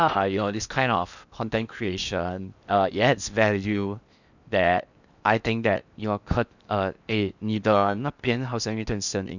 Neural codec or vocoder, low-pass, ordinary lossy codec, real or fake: codec, 16 kHz in and 24 kHz out, 0.8 kbps, FocalCodec, streaming, 65536 codes; 7.2 kHz; none; fake